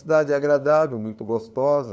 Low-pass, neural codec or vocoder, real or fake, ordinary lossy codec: none; codec, 16 kHz, 2 kbps, FunCodec, trained on LibriTTS, 25 frames a second; fake; none